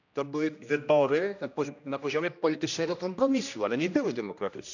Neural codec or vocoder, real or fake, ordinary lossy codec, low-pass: codec, 16 kHz, 1 kbps, X-Codec, HuBERT features, trained on general audio; fake; none; 7.2 kHz